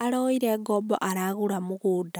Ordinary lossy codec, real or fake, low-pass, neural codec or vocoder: none; real; none; none